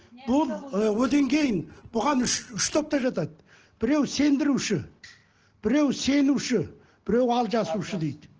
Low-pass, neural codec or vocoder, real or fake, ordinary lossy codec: 7.2 kHz; none; real; Opus, 16 kbps